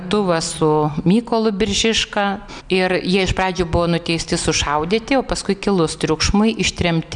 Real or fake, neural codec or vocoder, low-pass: real; none; 9.9 kHz